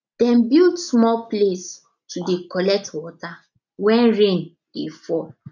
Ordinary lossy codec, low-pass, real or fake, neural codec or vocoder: none; 7.2 kHz; real; none